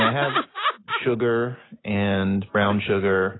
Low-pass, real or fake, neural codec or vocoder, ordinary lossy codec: 7.2 kHz; real; none; AAC, 16 kbps